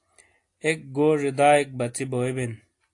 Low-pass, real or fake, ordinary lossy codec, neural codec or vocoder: 10.8 kHz; real; AAC, 48 kbps; none